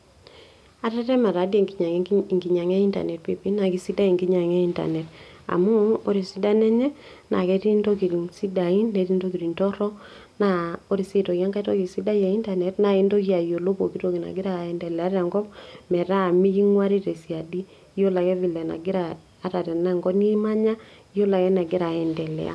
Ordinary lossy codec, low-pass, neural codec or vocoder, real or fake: none; none; none; real